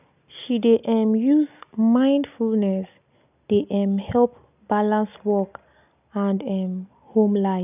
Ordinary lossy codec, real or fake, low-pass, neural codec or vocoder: none; real; 3.6 kHz; none